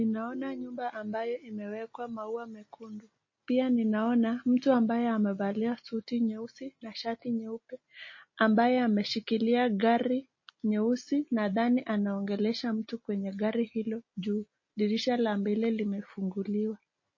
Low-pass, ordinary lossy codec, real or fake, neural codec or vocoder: 7.2 kHz; MP3, 32 kbps; real; none